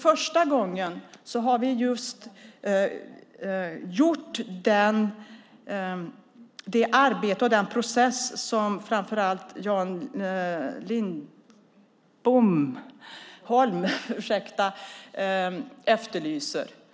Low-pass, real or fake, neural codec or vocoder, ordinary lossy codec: none; real; none; none